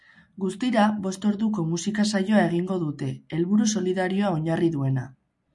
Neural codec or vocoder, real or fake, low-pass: none; real; 10.8 kHz